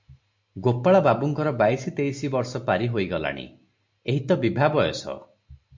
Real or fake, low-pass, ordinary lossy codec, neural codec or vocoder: real; 7.2 kHz; MP3, 48 kbps; none